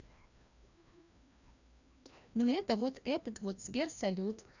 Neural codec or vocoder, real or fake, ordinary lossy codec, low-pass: codec, 16 kHz, 1 kbps, FreqCodec, larger model; fake; none; 7.2 kHz